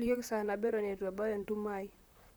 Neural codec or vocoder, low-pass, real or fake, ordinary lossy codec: vocoder, 44.1 kHz, 128 mel bands, Pupu-Vocoder; none; fake; none